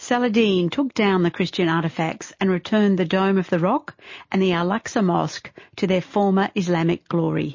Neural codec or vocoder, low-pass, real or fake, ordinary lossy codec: none; 7.2 kHz; real; MP3, 32 kbps